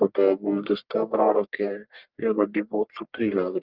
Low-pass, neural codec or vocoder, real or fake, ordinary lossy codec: 5.4 kHz; codec, 44.1 kHz, 1.7 kbps, Pupu-Codec; fake; Opus, 32 kbps